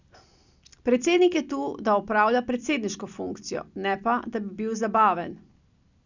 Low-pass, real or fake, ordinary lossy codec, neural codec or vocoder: 7.2 kHz; real; none; none